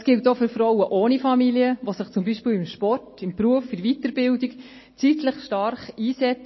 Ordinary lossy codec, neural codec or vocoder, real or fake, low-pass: MP3, 24 kbps; none; real; 7.2 kHz